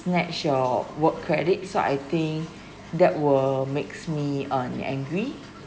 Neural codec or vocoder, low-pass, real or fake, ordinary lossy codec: none; none; real; none